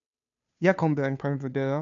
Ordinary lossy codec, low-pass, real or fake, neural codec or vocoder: MP3, 96 kbps; 7.2 kHz; fake; codec, 16 kHz, 2 kbps, FunCodec, trained on Chinese and English, 25 frames a second